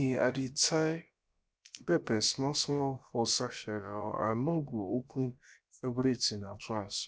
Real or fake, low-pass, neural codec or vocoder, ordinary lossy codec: fake; none; codec, 16 kHz, about 1 kbps, DyCAST, with the encoder's durations; none